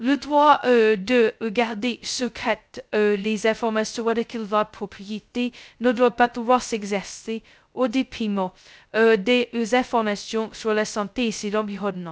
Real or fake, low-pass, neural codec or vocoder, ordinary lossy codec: fake; none; codec, 16 kHz, 0.2 kbps, FocalCodec; none